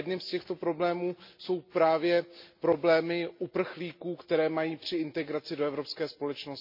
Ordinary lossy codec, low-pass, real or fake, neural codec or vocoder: MP3, 32 kbps; 5.4 kHz; real; none